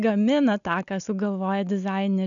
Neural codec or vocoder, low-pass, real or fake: none; 7.2 kHz; real